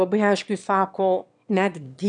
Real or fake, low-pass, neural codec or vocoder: fake; 9.9 kHz; autoencoder, 22.05 kHz, a latent of 192 numbers a frame, VITS, trained on one speaker